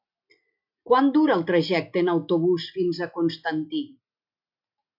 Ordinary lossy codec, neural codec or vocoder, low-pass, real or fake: MP3, 48 kbps; none; 5.4 kHz; real